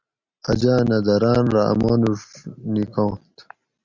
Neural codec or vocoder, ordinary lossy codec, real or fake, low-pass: none; Opus, 64 kbps; real; 7.2 kHz